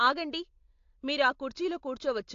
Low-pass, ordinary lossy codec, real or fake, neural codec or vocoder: 7.2 kHz; AAC, 48 kbps; real; none